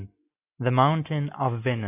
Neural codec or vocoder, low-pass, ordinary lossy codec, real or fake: none; 3.6 kHz; AAC, 32 kbps; real